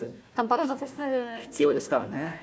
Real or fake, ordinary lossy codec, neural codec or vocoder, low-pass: fake; none; codec, 16 kHz, 1 kbps, FunCodec, trained on Chinese and English, 50 frames a second; none